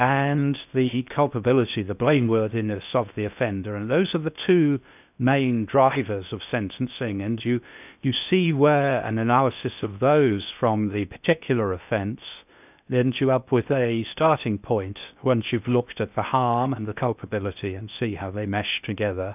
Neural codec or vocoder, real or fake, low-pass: codec, 16 kHz in and 24 kHz out, 0.6 kbps, FocalCodec, streaming, 2048 codes; fake; 3.6 kHz